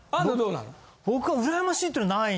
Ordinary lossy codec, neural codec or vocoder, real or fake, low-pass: none; none; real; none